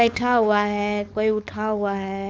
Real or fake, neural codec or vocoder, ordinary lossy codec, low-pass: fake; codec, 16 kHz, 4.8 kbps, FACodec; none; none